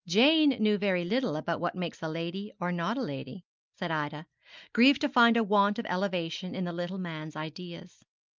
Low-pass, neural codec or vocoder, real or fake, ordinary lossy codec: 7.2 kHz; none; real; Opus, 24 kbps